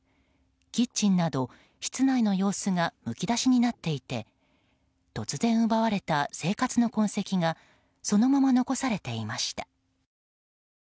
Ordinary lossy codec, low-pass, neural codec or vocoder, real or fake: none; none; none; real